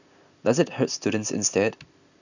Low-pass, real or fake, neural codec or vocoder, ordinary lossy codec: 7.2 kHz; real; none; none